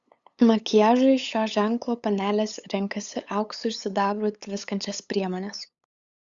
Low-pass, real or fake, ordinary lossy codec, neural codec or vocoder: 7.2 kHz; fake; Opus, 64 kbps; codec, 16 kHz, 8 kbps, FunCodec, trained on LibriTTS, 25 frames a second